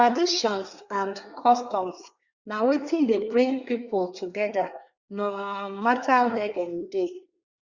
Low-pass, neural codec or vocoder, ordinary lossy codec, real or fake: 7.2 kHz; codec, 24 kHz, 1 kbps, SNAC; Opus, 64 kbps; fake